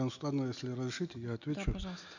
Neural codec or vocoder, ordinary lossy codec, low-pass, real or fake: none; none; 7.2 kHz; real